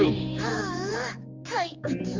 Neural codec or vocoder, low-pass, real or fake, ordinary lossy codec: codec, 16 kHz, 4 kbps, X-Codec, HuBERT features, trained on general audio; 7.2 kHz; fake; Opus, 32 kbps